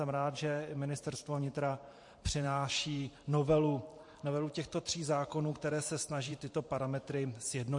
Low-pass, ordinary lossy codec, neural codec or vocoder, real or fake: 10.8 kHz; MP3, 48 kbps; vocoder, 44.1 kHz, 128 mel bands every 512 samples, BigVGAN v2; fake